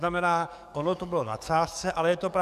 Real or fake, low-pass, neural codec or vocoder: fake; 14.4 kHz; codec, 44.1 kHz, 7.8 kbps, DAC